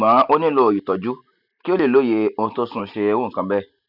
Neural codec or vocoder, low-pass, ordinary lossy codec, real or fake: none; 5.4 kHz; none; real